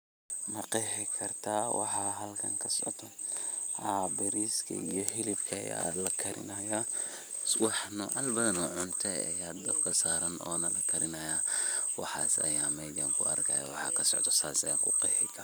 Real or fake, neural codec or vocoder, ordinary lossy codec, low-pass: real; none; none; none